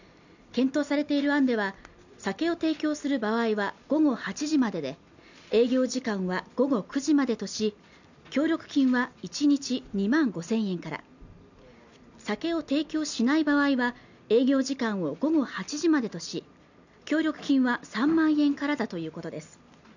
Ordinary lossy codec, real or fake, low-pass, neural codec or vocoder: none; real; 7.2 kHz; none